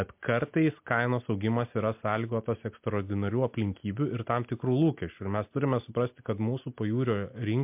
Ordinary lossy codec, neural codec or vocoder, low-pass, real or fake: MP3, 32 kbps; none; 3.6 kHz; real